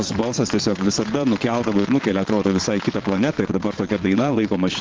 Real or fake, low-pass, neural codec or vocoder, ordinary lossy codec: fake; 7.2 kHz; vocoder, 22.05 kHz, 80 mel bands, Vocos; Opus, 16 kbps